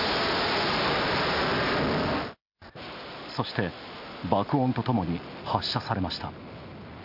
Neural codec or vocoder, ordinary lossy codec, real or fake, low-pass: none; none; real; 5.4 kHz